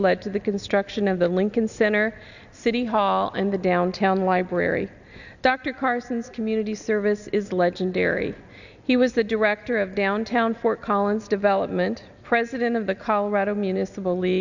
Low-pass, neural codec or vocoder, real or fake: 7.2 kHz; none; real